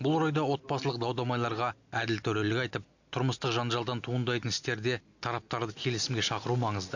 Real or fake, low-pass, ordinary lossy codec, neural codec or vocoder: real; 7.2 kHz; none; none